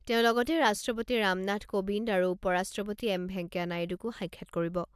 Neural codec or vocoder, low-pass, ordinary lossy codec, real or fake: none; 14.4 kHz; AAC, 96 kbps; real